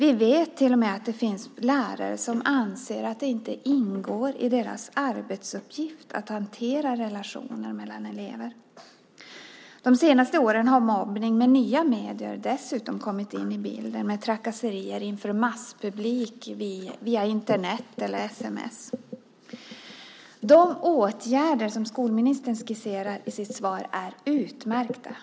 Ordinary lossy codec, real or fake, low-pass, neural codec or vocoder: none; real; none; none